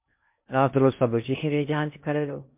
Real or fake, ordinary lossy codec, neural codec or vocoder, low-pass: fake; MP3, 32 kbps; codec, 16 kHz in and 24 kHz out, 0.6 kbps, FocalCodec, streaming, 2048 codes; 3.6 kHz